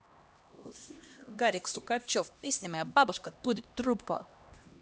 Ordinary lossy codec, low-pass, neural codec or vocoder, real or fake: none; none; codec, 16 kHz, 1 kbps, X-Codec, HuBERT features, trained on LibriSpeech; fake